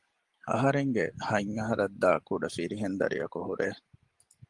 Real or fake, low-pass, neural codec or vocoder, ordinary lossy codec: real; 10.8 kHz; none; Opus, 24 kbps